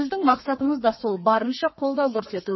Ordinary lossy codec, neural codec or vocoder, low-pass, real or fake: MP3, 24 kbps; codec, 32 kHz, 1.9 kbps, SNAC; 7.2 kHz; fake